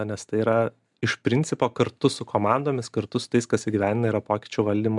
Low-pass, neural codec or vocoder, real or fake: 10.8 kHz; none; real